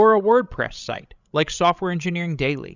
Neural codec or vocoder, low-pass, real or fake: codec, 16 kHz, 16 kbps, FreqCodec, larger model; 7.2 kHz; fake